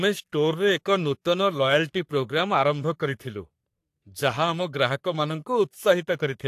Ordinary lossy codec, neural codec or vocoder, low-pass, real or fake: AAC, 64 kbps; codec, 44.1 kHz, 3.4 kbps, Pupu-Codec; 14.4 kHz; fake